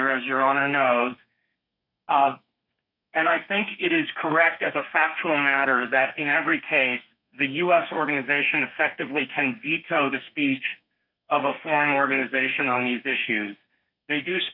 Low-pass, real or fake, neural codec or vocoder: 5.4 kHz; fake; codec, 32 kHz, 1.9 kbps, SNAC